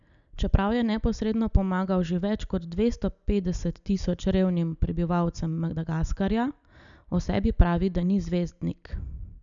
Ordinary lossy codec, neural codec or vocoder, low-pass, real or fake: none; none; 7.2 kHz; real